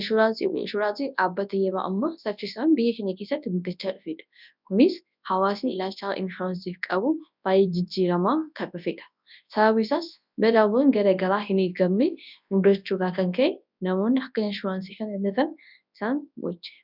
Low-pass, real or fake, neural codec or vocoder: 5.4 kHz; fake; codec, 24 kHz, 0.9 kbps, WavTokenizer, large speech release